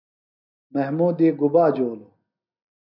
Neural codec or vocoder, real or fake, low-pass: none; real; 5.4 kHz